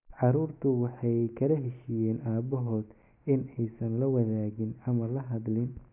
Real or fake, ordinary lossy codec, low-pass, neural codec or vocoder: real; none; 3.6 kHz; none